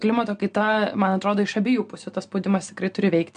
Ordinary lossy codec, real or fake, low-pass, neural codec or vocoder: MP3, 64 kbps; real; 9.9 kHz; none